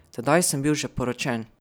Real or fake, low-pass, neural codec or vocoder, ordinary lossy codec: real; none; none; none